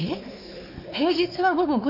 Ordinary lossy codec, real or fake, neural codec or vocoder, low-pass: none; fake; codec, 16 kHz, 4 kbps, X-Codec, WavLM features, trained on Multilingual LibriSpeech; 5.4 kHz